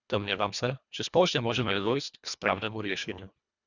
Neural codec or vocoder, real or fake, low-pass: codec, 24 kHz, 1.5 kbps, HILCodec; fake; 7.2 kHz